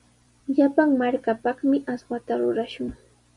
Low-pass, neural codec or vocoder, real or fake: 10.8 kHz; vocoder, 44.1 kHz, 128 mel bands every 256 samples, BigVGAN v2; fake